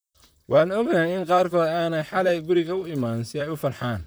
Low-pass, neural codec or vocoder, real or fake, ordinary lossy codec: none; vocoder, 44.1 kHz, 128 mel bands, Pupu-Vocoder; fake; none